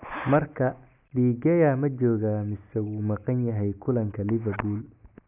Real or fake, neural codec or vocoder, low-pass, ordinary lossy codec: real; none; 3.6 kHz; none